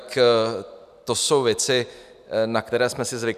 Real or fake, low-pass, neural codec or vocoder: real; 14.4 kHz; none